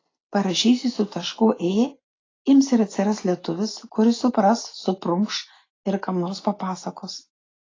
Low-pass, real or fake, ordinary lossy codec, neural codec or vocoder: 7.2 kHz; real; AAC, 32 kbps; none